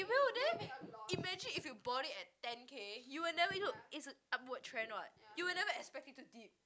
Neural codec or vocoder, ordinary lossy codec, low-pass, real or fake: none; none; none; real